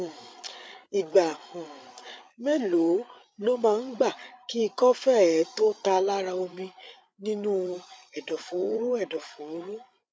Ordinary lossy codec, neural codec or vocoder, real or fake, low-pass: none; codec, 16 kHz, 8 kbps, FreqCodec, larger model; fake; none